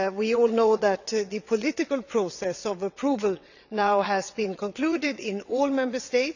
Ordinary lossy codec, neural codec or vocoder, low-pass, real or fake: none; vocoder, 22.05 kHz, 80 mel bands, WaveNeXt; 7.2 kHz; fake